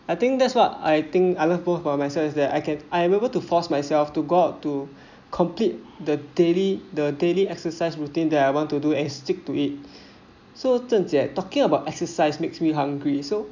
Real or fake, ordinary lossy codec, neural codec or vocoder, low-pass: real; none; none; 7.2 kHz